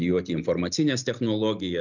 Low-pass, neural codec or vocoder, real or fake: 7.2 kHz; none; real